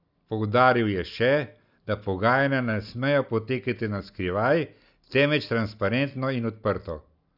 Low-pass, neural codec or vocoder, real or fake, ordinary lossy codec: 5.4 kHz; none; real; none